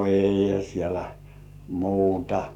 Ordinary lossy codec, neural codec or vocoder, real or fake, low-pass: none; codec, 44.1 kHz, 7.8 kbps, DAC; fake; 19.8 kHz